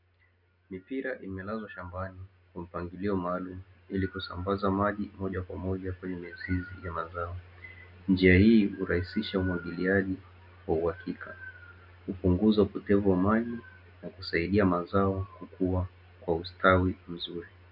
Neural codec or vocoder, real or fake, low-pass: none; real; 5.4 kHz